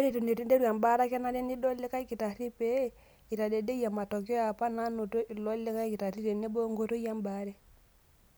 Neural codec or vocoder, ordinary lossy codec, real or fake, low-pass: none; none; real; none